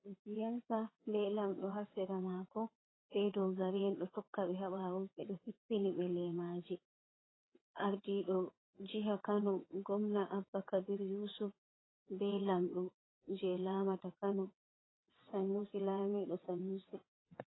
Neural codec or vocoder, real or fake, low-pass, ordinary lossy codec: vocoder, 22.05 kHz, 80 mel bands, WaveNeXt; fake; 7.2 kHz; AAC, 16 kbps